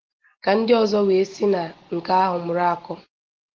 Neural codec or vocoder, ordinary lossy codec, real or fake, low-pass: none; Opus, 16 kbps; real; 7.2 kHz